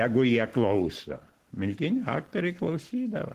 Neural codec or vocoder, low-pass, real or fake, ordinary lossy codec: codec, 44.1 kHz, 7.8 kbps, Pupu-Codec; 14.4 kHz; fake; Opus, 16 kbps